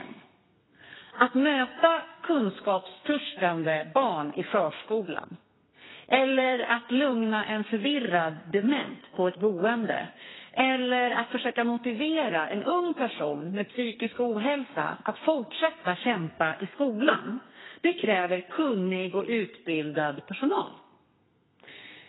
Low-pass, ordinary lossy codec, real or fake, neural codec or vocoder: 7.2 kHz; AAC, 16 kbps; fake; codec, 32 kHz, 1.9 kbps, SNAC